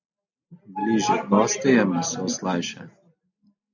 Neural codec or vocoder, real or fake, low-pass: none; real; 7.2 kHz